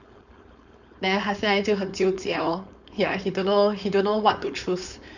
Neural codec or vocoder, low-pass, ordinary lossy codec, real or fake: codec, 16 kHz, 4.8 kbps, FACodec; 7.2 kHz; none; fake